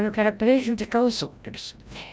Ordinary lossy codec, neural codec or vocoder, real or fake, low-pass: none; codec, 16 kHz, 0.5 kbps, FreqCodec, larger model; fake; none